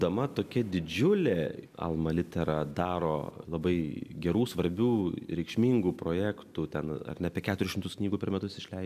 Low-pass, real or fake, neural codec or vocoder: 14.4 kHz; real; none